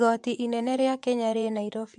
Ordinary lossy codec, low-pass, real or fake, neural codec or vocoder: MP3, 64 kbps; 10.8 kHz; fake; vocoder, 24 kHz, 100 mel bands, Vocos